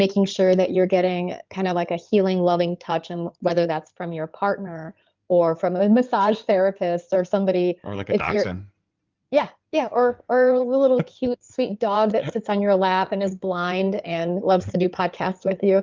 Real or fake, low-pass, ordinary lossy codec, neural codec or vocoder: fake; 7.2 kHz; Opus, 24 kbps; codec, 16 kHz in and 24 kHz out, 2.2 kbps, FireRedTTS-2 codec